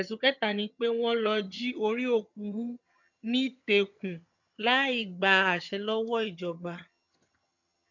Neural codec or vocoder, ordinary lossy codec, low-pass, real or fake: vocoder, 22.05 kHz, 80 mel bands, HiFi-GAN; none; 7.2 kHz; fake